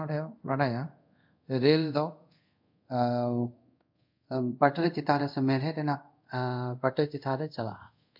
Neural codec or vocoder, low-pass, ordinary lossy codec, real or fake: codec, 24 kHz, 0.5 kbps, DualCodec; 5.4 kHz; MP3, 48 kbps; fake